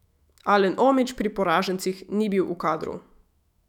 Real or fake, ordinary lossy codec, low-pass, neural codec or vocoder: fake; none; 19.8 kHz; autoencoder, 48 kHz, 128 numbers a frame, DAC-VAE, trained on Japanese speech